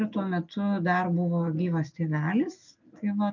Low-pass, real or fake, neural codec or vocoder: 7.2 kHz; real; none